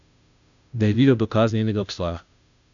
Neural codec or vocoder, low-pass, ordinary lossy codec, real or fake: codec, 16 kHz, 0.5 kbps, FunCodec, trained on Chinese and English, 25 frames a second; 7.2 kHz; none; fake